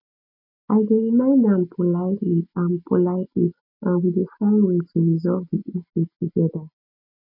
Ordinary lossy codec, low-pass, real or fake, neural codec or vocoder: none; 5.4 kHz; real; none